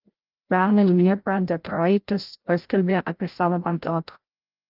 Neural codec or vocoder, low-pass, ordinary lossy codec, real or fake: codec, 16 kHz, 0.5 kbps, FreqCodec, larger model; 5.4 kHz; Opus, 32 kbps; fake